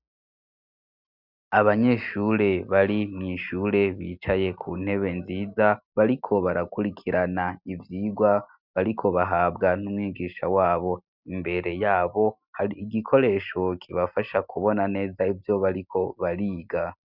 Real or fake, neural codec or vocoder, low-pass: real; none; 5.4 kHz